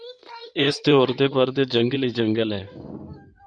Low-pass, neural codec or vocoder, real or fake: 9.9 kHz; codec, 16 kHz in and 24 kHz out, 2.2 kbps, FireRedTTS-2 codec; fake